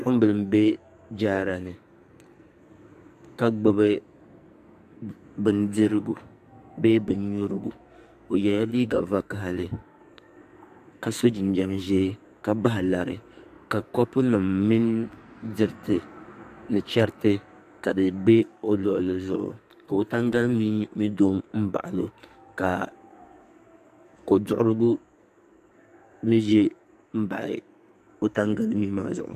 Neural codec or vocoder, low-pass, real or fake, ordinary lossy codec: codec, 44.1 kHz, 2.6 kbps, SNAC; 14.4 kHz; fake; AAC, 96 kbps